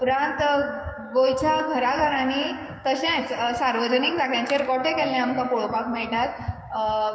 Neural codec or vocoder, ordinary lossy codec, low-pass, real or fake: codec, 16 kHz, 6 kbps, DAC; none; none; fake